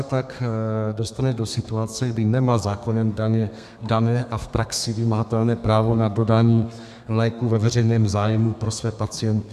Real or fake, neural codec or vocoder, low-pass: fake; codec, 32 kHz, 1.9 kbps, SNAC; 14.4 kHz